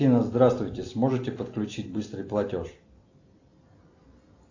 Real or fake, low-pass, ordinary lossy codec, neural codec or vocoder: real; 7.2 kHz; AAC, 48 kbps; none